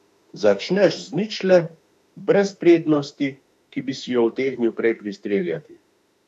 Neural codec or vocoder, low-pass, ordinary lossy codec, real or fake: autoencoder, 48 kHz, 32 numbers a frame, DAC-VAE, trained on Japanese speech; 14.4 kHz; none; fake